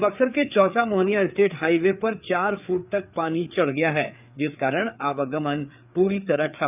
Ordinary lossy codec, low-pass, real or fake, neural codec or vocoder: none; 3.6 kHz; fake; codec, 16 kHz, 4 kbps, FreqCodec, larger model